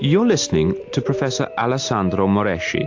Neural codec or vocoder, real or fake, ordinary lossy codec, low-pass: none; real; AAC, 48 kbps; 7.2 kHz